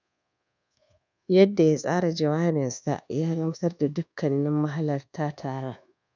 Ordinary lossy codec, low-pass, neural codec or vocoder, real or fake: none; 7.2 kHz; codec, 24 kHz, 1.2 kbps, DualCodec; fake